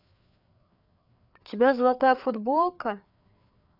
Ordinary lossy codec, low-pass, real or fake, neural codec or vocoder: none; 5.4 kHz; fake; codec, 16 kHz, 4 kbps, FreqCodec, larger model